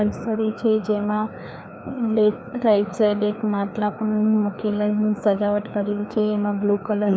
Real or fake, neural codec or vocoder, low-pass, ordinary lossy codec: fake; codec, 16 kHz, 2 kbps, FreqCodec, larger model; none; none